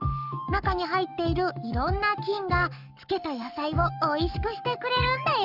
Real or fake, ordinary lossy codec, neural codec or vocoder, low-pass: fake; none; codec, 44.1 kHz, 7.8 kbps, Pupu-Codec; 5.4 kHz